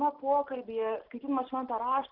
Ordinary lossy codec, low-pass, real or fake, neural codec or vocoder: Opus, 32 kbps; 5.4 kHz; real; none